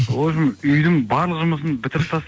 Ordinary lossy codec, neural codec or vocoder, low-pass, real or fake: none; none; none; real